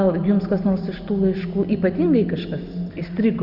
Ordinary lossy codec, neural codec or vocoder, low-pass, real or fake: Opus, 64 kbps; none; 5.4 kHz; real